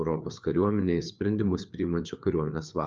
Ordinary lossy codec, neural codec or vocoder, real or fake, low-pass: Opus, 24 kbps; codec, 16 kHz, 4 kbps, FreqCodec, larger model; fake; 7.2 kHz